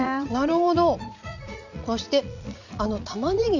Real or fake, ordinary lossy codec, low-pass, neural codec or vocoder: fake; none; 7.2 kHz; vocoder, 44.1 kHz, 80 mel bands, Vocos